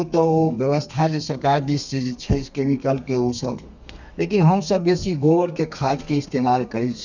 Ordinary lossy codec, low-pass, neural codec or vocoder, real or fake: none; 7.2 kHz; codec, 32 kHz, 1.9 kbps, SNAC; fake